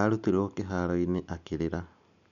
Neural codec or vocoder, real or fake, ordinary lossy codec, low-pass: none; real; none; 7.2 kHz